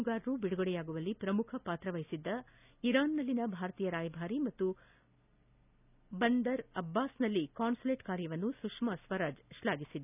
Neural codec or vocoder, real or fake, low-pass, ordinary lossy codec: none; real; 3.6 kHz; none